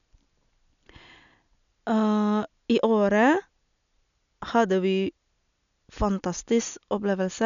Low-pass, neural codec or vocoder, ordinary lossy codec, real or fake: 7.2 kHz; none; none; real